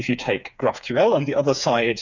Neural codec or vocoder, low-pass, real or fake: codec, 16 kHz, 4 kbps, FreqCodec, smaller model; 7.2 kHz; fake